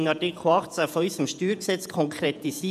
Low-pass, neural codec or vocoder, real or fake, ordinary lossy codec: 14.4 kHz; vocoder, 48 kHz, 128 mel bands, Vocos; fake; none